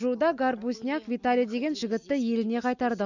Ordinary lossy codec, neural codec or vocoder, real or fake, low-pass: none; none; real; 7.2 kHz